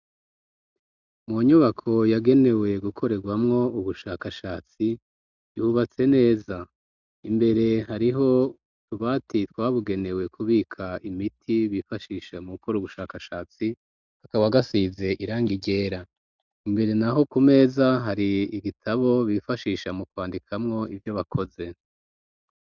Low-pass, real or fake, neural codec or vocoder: 7.2 kHz; real; none